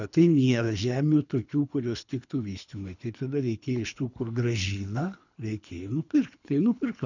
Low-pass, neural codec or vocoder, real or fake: 7.2 kHz; codec, 24 kHz, 3 kbps, HILCodec; fake